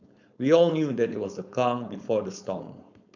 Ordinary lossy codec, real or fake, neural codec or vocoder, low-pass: none; fake; codec, 16 kHz, 4.8 kbps, FACodec; 7.2 kHz